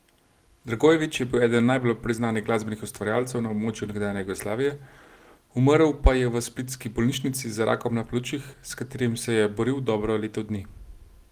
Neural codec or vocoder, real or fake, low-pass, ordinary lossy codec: none; real; 14.4 kHz; Opus, 24 kbps